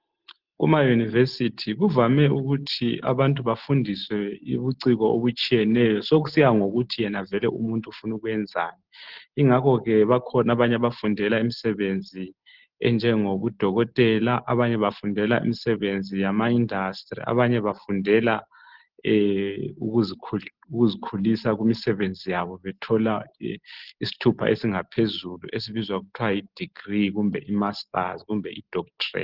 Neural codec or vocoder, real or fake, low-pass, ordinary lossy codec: none; real; 5.4 kHz; Opus, 16 kbps